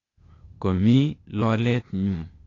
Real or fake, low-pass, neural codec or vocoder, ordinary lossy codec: fake; 7.2 kHz; codec, 16 kHz, 0.8 kbps, ZipCodec; AAC, 32 kbps